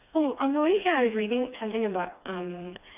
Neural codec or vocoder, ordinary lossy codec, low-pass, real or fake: codec, 16 kHz, 2 kbps, FreqCodec, smaller model; none; 3.6 kHz; fake